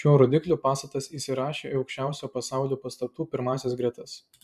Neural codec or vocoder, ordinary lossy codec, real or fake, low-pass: vocoder, 44.1 kHz, 128 mel bands every 512 samples, BigVGAN v2; MP3, 96 kbps; fake; 14.4 kHz